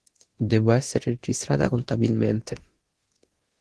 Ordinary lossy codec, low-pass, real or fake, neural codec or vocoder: Opus, 16 kbps; 10.8 kHz; fake; codec, 24 kHz, 0.9 kbps, DualCodec